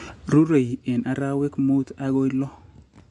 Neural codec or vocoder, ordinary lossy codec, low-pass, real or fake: none; MP3, 64 kbps; 10.8 kHz; real